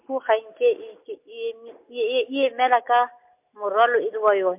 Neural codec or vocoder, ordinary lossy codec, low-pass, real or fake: none; MP3, 32 kbps; 3.6 kHz; real